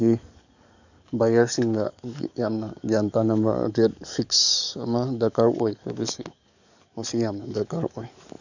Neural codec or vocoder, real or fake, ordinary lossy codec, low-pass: codec, 44.1 kHz, 7.8 kbps, DAC; fake; none; 7.2 kHz